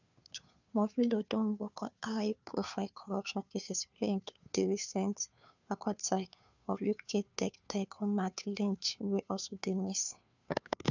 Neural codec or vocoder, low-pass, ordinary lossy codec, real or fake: codec, 16 kHz, 2 kbps, FunCodec, trained on Chinese and English, 25 frames a second; 7.2 kHz; none; fake